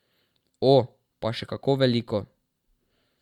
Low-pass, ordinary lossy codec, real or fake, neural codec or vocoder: 19.8 kHz; Opus, 64 kbps; fake; vocoder, 44.1 kHz, 128 mel bands every 512 samples, BigVGAN v2